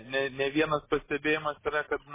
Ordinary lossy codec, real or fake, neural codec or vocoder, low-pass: MP3, 16 kbps; real; none; 3.6 kHz